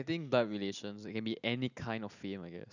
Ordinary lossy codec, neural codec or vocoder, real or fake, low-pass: none; none; real; 7.2 kHz